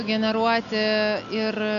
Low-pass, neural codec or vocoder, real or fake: 7.2 kHz; none; real